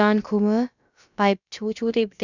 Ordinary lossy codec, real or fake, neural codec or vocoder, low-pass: none; fake; codec, 16 kHz, about 1 kbps, DyCAST, with the encoder's durations; 7.2 kHz